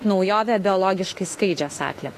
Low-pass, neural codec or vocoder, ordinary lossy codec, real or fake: 14.4 kHz; autoencoder, 48 kHz, 32 numbers a frame, DAC-VAE, trained on Japanese speech; AAC, 48 kbps; fake